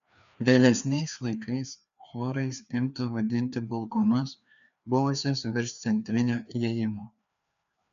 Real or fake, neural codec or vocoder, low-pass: fake; codec, 16 kHz, 2 kbps, FreqCodec, larger model; 7.2 kHz